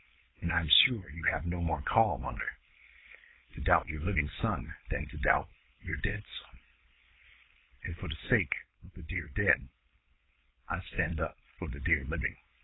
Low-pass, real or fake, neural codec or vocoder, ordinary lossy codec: 7.2 kHz; fake; codec, 16 kHz, 4.8 kbps, FACodec; AAC, 16 kbps